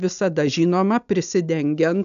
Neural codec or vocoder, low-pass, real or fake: none; 7.2 kHz; real